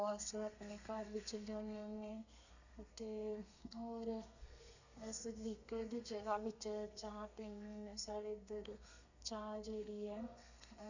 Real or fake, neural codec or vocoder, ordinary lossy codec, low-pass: fake; codec, 32 kHz, 1.9 kbps, SNAC; none; 7.2 kHz